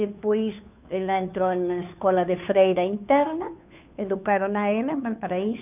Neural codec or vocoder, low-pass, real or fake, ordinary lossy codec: codec, 16 kHz, 2 kbps, FunCodec, trained on Chinese and English, 25 frames a second; 3.6 kHz; fake; none